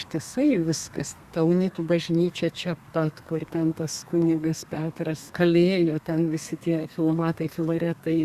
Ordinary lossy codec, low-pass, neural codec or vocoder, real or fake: Opus, 64 kbps; 14.4 kHz; codec, 32 kHz, 1.9 kbps, SNAC; fake